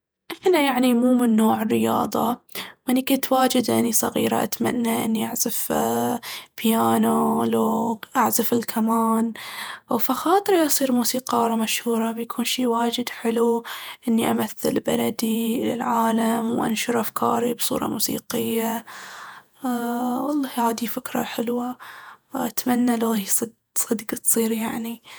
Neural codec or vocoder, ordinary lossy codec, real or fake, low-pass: vocoder, 48 kHz, 128 mel bands, Vocos; none; fake; none